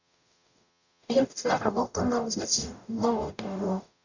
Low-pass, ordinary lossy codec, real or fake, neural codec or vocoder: 7.2 kHz; none; fake; codec, 44.1 kHz, 0.9 kbps, DAC